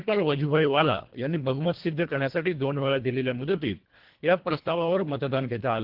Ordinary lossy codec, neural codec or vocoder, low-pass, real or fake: Opus, 16 kbps; codec, 24 kHz, 1.5 kbps, HILCodec; 5.4 kHz; fake